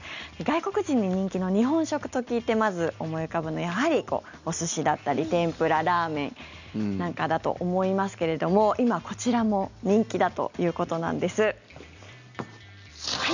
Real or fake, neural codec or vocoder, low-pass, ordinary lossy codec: real; none; 7.2 kHz; none